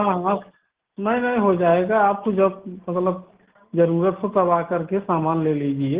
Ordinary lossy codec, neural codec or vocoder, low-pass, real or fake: Opus, 16 kbps; none; 3.6 kHz; real